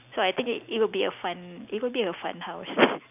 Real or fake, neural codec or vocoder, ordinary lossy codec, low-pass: real; none; none; 3.6 kHz